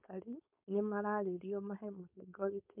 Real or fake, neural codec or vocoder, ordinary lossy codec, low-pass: fake; codec, 16 kHz, 4.8 kbps, FACodec; MP3, 32 kbps; 3.6 kHz